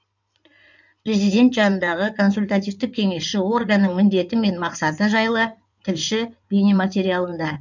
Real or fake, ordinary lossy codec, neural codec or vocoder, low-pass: fake; none; codec, 16 kHz in and 24 kHz out, 2.2 kbps, FireRedTTS-2 codec; 7.2 kHz